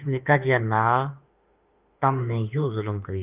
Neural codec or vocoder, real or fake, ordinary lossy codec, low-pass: autoencoder, 48 kHz, 32 numbers a frame, DAC-VAE, trained on Japanese speech; fake; Opus, 24 kbps; 3.6 kHz